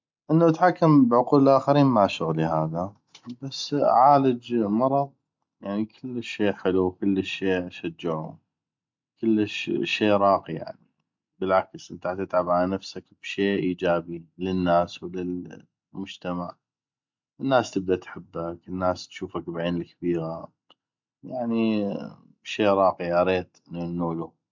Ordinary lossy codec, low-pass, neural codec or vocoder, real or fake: MP3, 64 kbps; 7.2 kHz; none; real